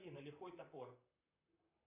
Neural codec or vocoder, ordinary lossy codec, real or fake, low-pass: vocoder, 44.1 kHz, 128 mel bands, Pupu-Vocoder; MP3, 32 kbps; fake; 3.6 kHz